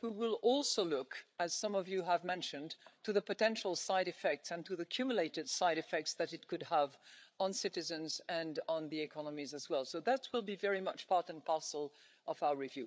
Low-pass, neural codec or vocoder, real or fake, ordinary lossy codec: none; codec, 16 kHz, 8 kbps, FreqCodec, larger model; fake; none